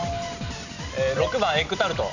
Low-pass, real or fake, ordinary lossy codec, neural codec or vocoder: 7.2 kHz; real; none; none